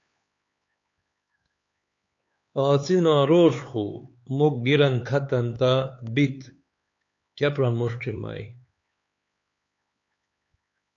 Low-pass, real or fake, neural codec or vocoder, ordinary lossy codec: 7.2 kHz; fake; codec, 16 kHz, 4 kbps, X-Codec, HuBERT features, trained on LibriSpeech; AAC, 48 kbps